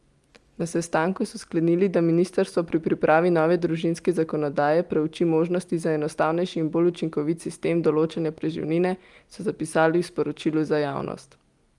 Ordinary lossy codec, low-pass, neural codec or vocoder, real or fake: Opus, 32 kbps; 10.8 kHz; none; real